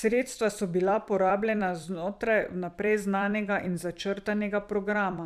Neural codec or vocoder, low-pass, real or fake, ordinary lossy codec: vocoder, 44.1 kHz, 128 mel bands every 256 samples, BigVGAN v2; 14.4 kHz; fake; none